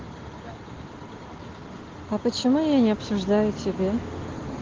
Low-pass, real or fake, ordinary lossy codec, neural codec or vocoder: 7.2 kHz; real; Opus, 16 kbps; none